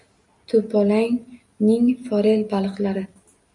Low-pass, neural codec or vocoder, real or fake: 10.8 kHz; none; real